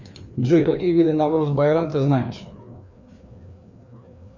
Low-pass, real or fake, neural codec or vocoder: 7.2 kHz; fake; codec, 16 kHz, 2 kbps, FreqCodec, larger model